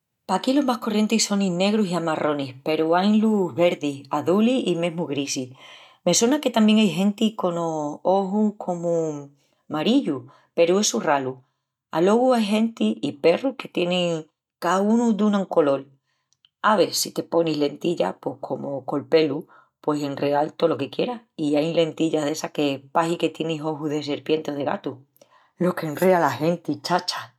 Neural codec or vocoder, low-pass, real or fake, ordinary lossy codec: none; 19.8 kHz; real; none